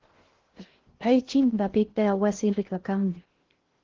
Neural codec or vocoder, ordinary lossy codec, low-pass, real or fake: codec, 16 kHz in and 24 kHz out, 0.6 kbps, FocalCodec, streaming, 2048 codes; Opus, 16 kbps; 7.2 kHz; fake